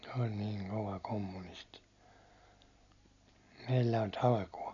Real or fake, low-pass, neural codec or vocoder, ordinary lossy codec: real; 7.2 kHz; none; none